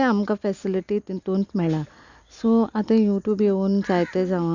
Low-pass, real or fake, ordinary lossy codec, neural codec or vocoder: 7.2 kHz; real; Opus, 64 kbps; none